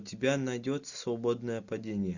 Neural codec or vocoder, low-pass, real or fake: none; 7.2 kHz; real